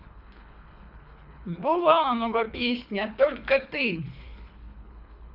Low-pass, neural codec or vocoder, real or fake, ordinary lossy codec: 5.4 kHz; codec, 24 kHz, 3 kbps, HILCodec; fake; none